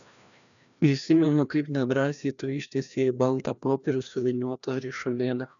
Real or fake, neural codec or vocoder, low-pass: fake; codec, 16 kHz, 1 kbps, FreqCodec, larger model; 7.2 kHz